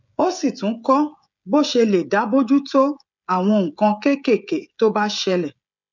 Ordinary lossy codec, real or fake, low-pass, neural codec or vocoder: none; fake; 7.2 kHz; codec, 16 kHz, 16 kbps, FreqCodec, smaller model